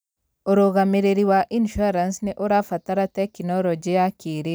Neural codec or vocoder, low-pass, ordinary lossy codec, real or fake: none; none; none; real